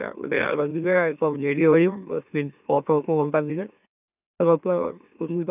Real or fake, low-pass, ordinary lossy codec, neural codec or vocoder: fake; 3.6 kHz; none; autoencoder, 44.1 kHz, a latent of 192 numbers a frame, MeloTTS